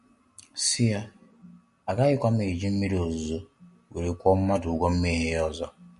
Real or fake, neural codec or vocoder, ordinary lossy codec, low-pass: real; none; MP3, 48 kbps; 14.4 kHz